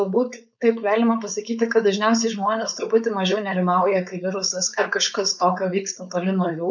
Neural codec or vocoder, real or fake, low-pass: codec, 16 kHz, 4.8 kbps, FACodec; fake; 7.2 kHz